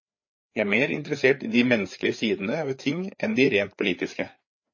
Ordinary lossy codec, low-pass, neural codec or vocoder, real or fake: MP3, 32 kbps; 7.2 kHz; codec, 16 kHz, 4 kbps, FreqCodec, larger model; fake